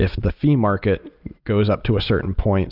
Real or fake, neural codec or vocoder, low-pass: real; none; 5.4 kHz